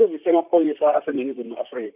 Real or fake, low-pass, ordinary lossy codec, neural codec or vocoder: fake; 3.6 kHz; none; vocoder, 44.1 kHz, 128 mel bands, Pupu-Vocoder